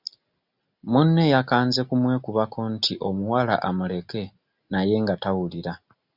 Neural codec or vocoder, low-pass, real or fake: none; 5.4 kHz; real